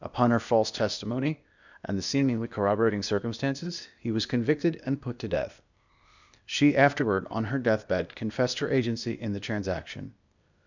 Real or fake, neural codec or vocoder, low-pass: fake; codec, 16 kHz, 0.8 kbps, ZipCodec; 7.2 kHz